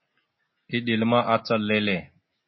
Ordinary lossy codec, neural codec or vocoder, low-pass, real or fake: MP3, 24 kbps; none; 7.2 kHz; real